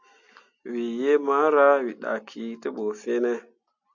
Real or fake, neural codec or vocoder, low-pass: real; none; 7.2 kHz